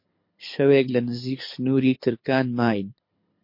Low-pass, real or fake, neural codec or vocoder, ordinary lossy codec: 5.4 kHz; fake; codec, 24 kHz, 6 kbps, HILCodec; MP3, 32 kbps